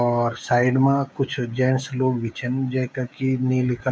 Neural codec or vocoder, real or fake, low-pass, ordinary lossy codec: codec, 16 kHz, 16 kbps, FreqCodec, smaller model; fake; none; none